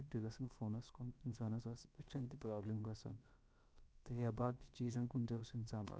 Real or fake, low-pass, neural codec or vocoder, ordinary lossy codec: fake; none; codec, 16 kHz, about 1 kbps, DyCAST, with the encoder's durations; none